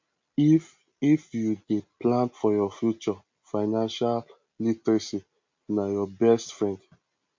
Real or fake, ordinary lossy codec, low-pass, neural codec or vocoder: real; MP3, 48 kbps; 7.2 kHz; none